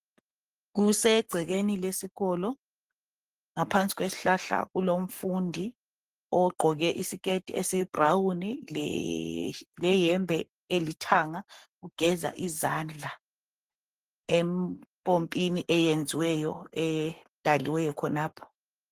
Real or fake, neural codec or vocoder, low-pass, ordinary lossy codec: fake; codec, 44.1 kHz, 7.8 kbps, Pupu-Codec; 14.4 kHz; Opus, 24 kbps